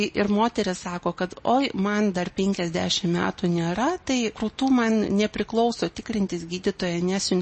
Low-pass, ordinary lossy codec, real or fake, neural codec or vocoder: 10.8 kHz; MP3, 32 kbps; real; none